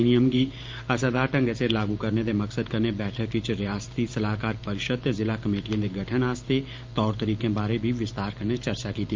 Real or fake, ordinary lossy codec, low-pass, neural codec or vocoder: fake; Opus, 32 kbps; 7.2 kHz; vocoder, 44.1 kHz, 128 mel bands every 512 samples, BigVGAN v2